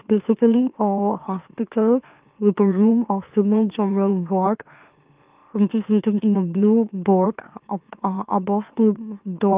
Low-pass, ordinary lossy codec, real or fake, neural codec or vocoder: 3.6 kHz; Opus, 24 kbps; fake; autoencoder, 44.1 kHz, a latent of 192 numbers a frame, MeloTTS